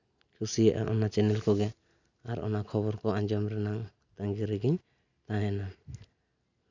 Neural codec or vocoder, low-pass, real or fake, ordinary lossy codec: none; 7.2 kHz; real; none